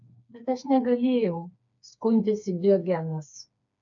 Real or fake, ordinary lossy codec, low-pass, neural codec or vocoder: fake; MP3, 96 kbps; 7.2 kHz; codec, 16 kHz, 4 kbps, FreqCodec, smaller model